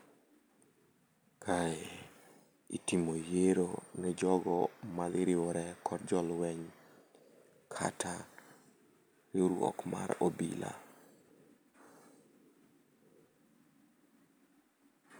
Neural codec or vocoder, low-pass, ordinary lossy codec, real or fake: none; none; none; real